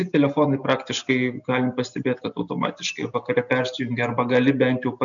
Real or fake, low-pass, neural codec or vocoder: real; 7.2 kHz; none